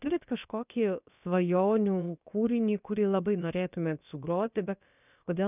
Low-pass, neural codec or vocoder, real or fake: 3.6 kHz; codec, 16 kHz, about 1 kbps, DyCAST, with the encoder's durations; fake